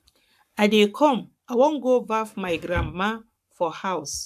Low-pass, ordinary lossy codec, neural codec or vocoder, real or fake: 14.4 kHz; AAC, 96 kbps; codec, 44.1 kHz, 7.8 kbps, Pupu-Codec; fake